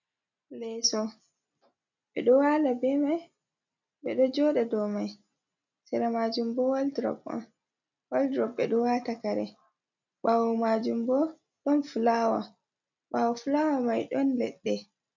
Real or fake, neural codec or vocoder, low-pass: real; none; 7.2 kHz